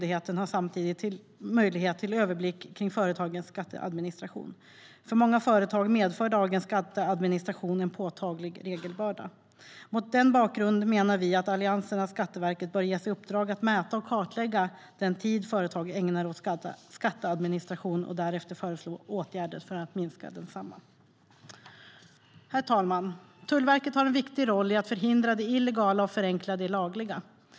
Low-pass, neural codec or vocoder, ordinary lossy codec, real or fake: none; none; none; real